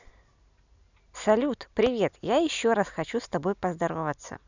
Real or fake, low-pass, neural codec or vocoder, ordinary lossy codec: real; 7.2 kHz; none; none